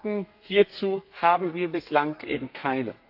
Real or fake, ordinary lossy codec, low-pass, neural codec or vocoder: fake; AAC, 48 kbps; 5.4 kHz; codec, 32 kHz, 1.9 kbps, SNAC